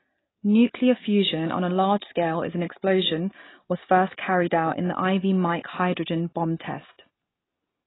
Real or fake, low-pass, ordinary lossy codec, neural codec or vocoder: real; 7.2 kHz; AAC, 16 kbps; none